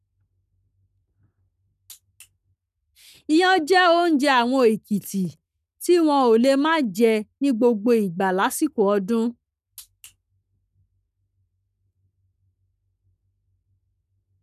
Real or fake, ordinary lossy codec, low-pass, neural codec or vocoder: fake; none; 14.4 kHz; codec, 44.1 kHz, 7.8 kbps, Pupu-Codec